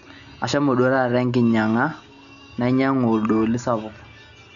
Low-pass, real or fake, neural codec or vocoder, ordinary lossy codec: 7.2 kHz; real; none; none